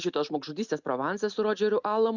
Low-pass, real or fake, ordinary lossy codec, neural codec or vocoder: 7.2 kHz; real; Opus, 64 kbps; none